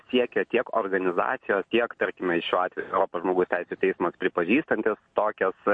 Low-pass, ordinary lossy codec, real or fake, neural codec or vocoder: 9.9 kHz; MP3, 48 kbps; real; none